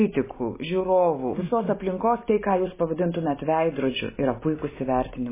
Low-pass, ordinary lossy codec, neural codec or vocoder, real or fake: 3.6 kHz; MP3, 16 kbps; none; real